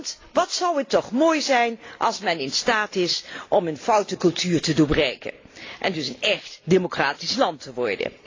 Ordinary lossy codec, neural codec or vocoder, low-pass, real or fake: AAC, 32 kbps; none; 7.2 kHz; real